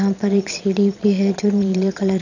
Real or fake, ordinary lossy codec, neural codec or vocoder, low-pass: fake; none; vocoder, 22.05 kHz, 80 mel bands, Vocos; 7.2 kHz